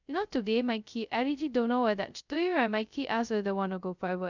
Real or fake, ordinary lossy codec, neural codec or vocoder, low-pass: fake; none; codec, 16 kHz, 0.2 kbps, FocalCodec; 7.2 kHz